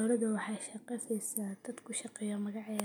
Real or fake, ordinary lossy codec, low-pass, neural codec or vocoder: real; none; none; none